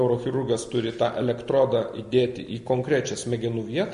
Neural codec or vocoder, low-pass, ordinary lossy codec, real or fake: none; 14.4 kHz; MP3, 48 kbps; real